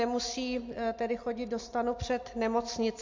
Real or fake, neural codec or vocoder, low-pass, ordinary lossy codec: real; none; 7.2 kHz; MP3, 48 kbps